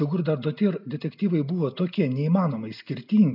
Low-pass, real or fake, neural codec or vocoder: 5.4 kHz; real; none